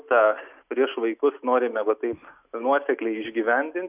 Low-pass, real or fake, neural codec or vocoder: 3.6 kHz; real; none